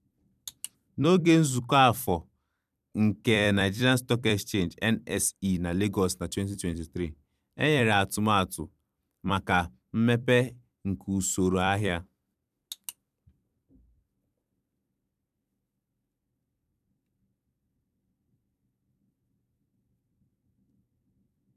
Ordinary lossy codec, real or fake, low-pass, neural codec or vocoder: none; fake; 14.4 kHz; vocoder, 44.1 kHz, 128 mel bands every 256 samples, BigVGAN v2